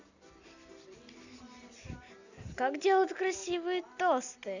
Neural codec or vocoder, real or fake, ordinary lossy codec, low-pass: none; real; none; 7.2 kHz